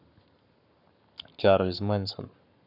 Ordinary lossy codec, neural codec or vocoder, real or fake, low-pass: none; codec, 44.1 kHz, 7.8 kbps, Pupu-Codec; fake; 5.4 kHz